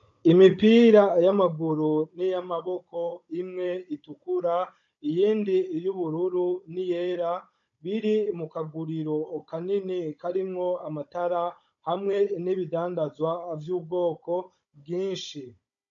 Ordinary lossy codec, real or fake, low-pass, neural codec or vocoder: AAC, 64 kbps; fake; 7.2 kHz; codec, 16 kHz, 16 kbps, FunCodec, trained on Chinese and English, 50 frames a second